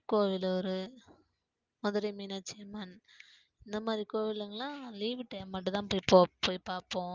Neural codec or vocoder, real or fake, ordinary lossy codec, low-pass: none; real; Opus, 24 kbps; 7.2 kHz